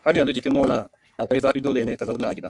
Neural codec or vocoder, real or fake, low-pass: codec, 44.1 kHz, 3.4 kbps, Pupu-Codec; fake; 10.8 kHz